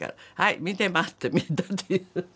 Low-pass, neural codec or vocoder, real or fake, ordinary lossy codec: none; none; real; none